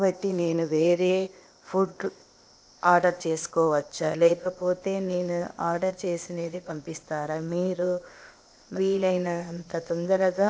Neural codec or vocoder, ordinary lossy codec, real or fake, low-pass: codec, 16 kHz, 0.8 kbps, ZipCodec; none; fake; none